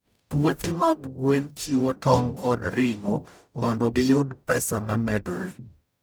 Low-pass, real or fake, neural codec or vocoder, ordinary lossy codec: none; fake; codec, 44.1 kHz, 0.9 kbps, DAC; none